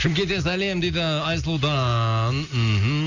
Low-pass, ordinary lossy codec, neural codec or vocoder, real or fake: 7.2 kHz; none; none; real